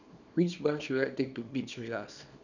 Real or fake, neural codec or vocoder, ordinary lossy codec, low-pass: fake; codec, 24 kHz, 0.9 kbps, WavTokenizer, small release; none; 7.2 kHz